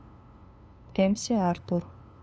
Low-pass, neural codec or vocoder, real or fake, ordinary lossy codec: none; codec, 16 kHz, 2 kbps, FunCodec, trained on LibriTTS, 25 frames a second; fake; none